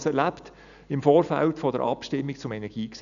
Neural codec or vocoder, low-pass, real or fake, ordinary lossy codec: none; 7.2 kHz; real; none